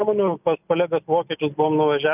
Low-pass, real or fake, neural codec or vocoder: 3.6 kHz; real; none